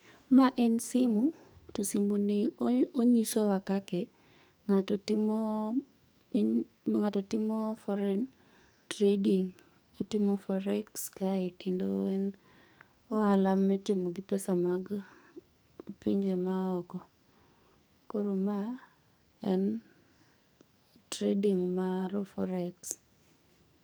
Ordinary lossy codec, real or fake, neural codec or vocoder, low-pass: none; fake; codec, 44.1 kHz, 2.6 kbps, SNAC; none